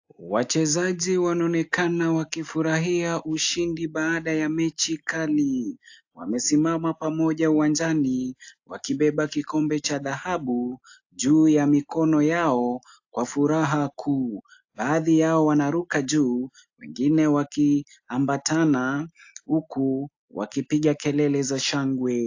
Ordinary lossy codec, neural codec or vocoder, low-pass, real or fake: AAC, 48 kbps; none; 7.2 kHz; real